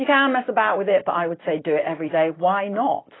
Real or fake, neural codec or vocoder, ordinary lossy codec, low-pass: real; none; AAC, 16 kbps; 7.2 kHz